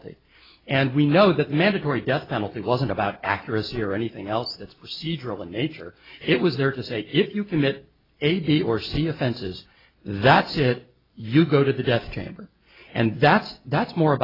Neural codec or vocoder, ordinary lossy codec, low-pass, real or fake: none; AAC, 24 kbps; 5.4 kHz; real